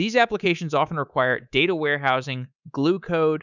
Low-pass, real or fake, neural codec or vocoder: 7.2 kHz; fake; codec, 24 kHz, 3.1 kbps, DualCodec